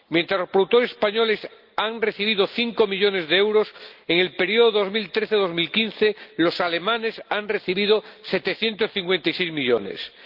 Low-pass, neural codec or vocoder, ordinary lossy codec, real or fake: 5.4 kHz; none; Opus, 32 kbps; real